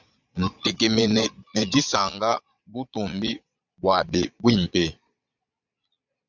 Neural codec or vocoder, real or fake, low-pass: vocoder, 22.05 kHz, 80 mel bands, Vocos; fake; 7.2 kHz